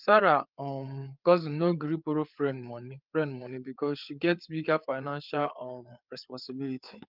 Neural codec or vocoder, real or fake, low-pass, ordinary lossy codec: codec, 16 kHz, 4 kbps, FreqCodec, larger model; fake; 5.4 kHz; Opus, 24 kbps